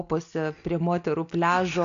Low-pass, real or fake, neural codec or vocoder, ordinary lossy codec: 7.2 kHz; real; none; AAC, 96 kbps